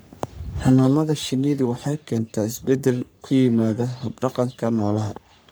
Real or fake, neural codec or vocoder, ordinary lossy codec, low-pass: fake; codec, 44.1 kHz, 3.4 kbps, Pupu-Codec; none; none